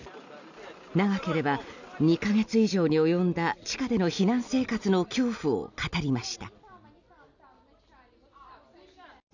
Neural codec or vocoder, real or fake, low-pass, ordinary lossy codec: none; real; 7.2 kHz; none